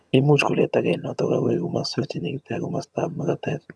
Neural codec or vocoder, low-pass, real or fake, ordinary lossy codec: vocoder, 22.05 kHz, 80 mel bands, HiFi-GAN; none; fake; none